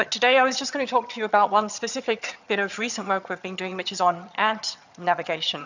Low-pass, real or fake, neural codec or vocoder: 7.2 kHz; fake; vocoder, 22.05 kHz, 80 mel bands, HiFi-GAN